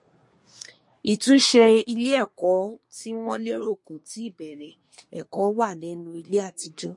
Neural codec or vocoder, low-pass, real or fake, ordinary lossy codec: codec, 24 kHz, 1 kbps, SNAC; 10.8 kHz; fake; MP3, 48 kbps